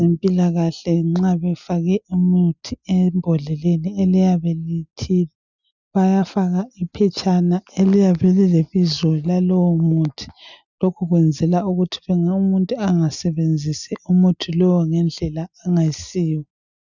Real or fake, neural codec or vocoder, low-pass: real; none; 7.2 kHz